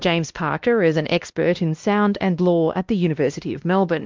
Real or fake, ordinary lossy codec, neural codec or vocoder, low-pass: fake; Opus, 32 kbps; codec, 16 kHz, 1 kbps, X-Codec, WavLM features, trained on Multilingual LibriSpeech; 7.2 kHz